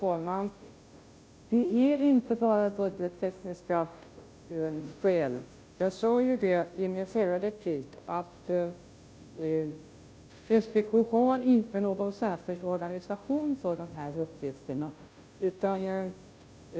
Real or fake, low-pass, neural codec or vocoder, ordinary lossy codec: fake; none; codec, 16 kHz, 0.5 kbps, FunCodec, trained on Chinese and English, 25 frames a second; none